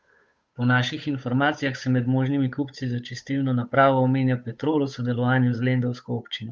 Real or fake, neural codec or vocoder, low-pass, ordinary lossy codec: fake; codec, 16 kHz, 8 kbps, FunCodec, trained on Chinese and English, 25 frames a second; none; none